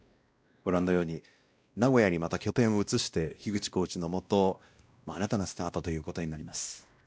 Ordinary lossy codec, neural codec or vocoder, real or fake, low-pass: none; codec, 16 kHz, 1 kbps, X-Codec, WavLM features, trained on Multilingual LibriSpeech; fake; none